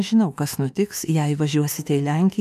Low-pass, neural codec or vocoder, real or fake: 14.4 kHz; autoencoder, 48 kHz, 32 numbers a frame, DAC-VAE, trained on Japanese speech; fake